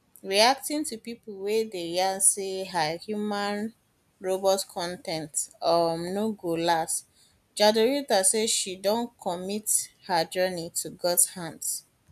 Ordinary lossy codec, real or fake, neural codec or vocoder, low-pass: none; real; none; 14.4 kHz